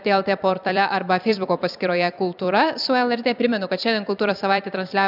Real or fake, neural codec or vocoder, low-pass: fake; vocoder, 44.1 kHz, 128 mel bands every 256 samples, BigVGAN v2; 5.4 kHz